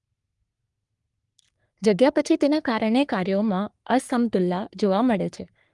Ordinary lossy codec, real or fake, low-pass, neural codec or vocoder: Opus, 32 kbps; fake; 10.8 kHz; codec, 44.1 kHz, 3.4 kbps, Pupu-Codec